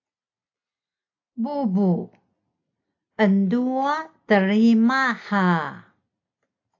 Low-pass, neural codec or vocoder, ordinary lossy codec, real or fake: 7.2 kHz; none; AAC, 48 kbps; real